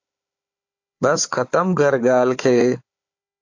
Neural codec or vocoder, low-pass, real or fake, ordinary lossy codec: codec, 16 kHz, 4 kbps, FunCodec, trained on Chinese and English, 50 frames a second; 7.2 kHz; fake; AAC, 48 kbps